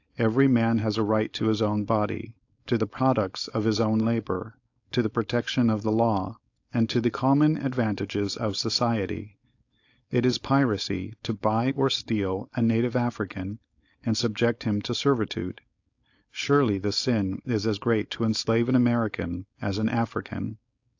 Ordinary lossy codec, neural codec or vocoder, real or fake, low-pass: AAC, 48 kbps; codec, 16 kHz, 4.8 kbps, FACodec; fake; 7.2 kHz